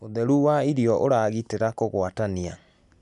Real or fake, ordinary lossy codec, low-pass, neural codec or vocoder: real; none; 10.8 kHz; none